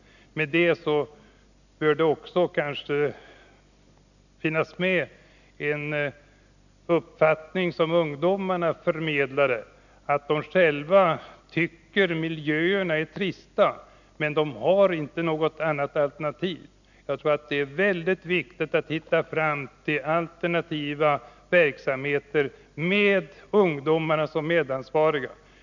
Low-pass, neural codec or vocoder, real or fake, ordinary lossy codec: 7.2 kHz; none; real; none